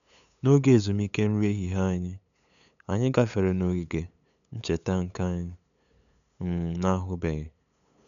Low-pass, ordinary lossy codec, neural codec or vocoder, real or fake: 7.2 kHz; none; codec, 16 kHz, 8 kbps, FunCodec, trained on LibriTTS, 25 frames a second; fake